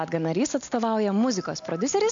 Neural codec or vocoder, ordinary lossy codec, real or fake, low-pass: none; MP3, 48 kbps; real; 7.2 kHz